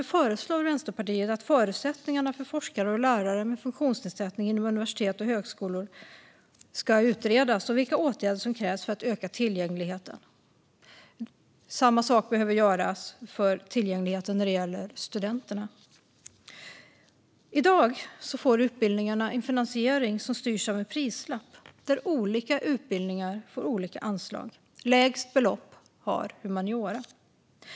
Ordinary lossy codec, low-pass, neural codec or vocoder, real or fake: none; none; none; real